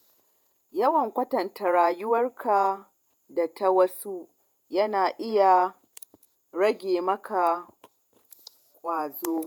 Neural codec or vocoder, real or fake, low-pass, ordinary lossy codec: vocoder, 48 kHz, 128 mel bands, Vocos; fake; none; none